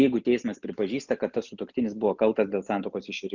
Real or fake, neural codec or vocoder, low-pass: real; none; 7.2 kHz